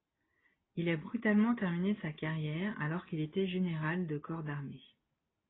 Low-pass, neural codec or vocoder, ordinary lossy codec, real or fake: 7.2 kHz; none; AAC, 16 kbps; real